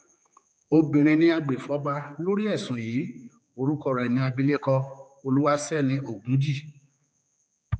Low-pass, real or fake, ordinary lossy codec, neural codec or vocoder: none; fake; none; codec, 16 kHz, 4 kbps, X-Codec, HuBERT features, trained on general audio